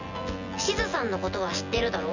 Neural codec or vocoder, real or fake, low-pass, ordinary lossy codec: vocoder, 24 kHz, 100 mel bands, Vocos; fake; 7.2 kHz; none